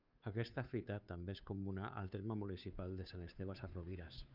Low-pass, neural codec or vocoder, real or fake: 5.4 kHz; codec, 16 kHz, 2 kbps, FunCodec, trained on Chinese and English, 25 frames a second; fake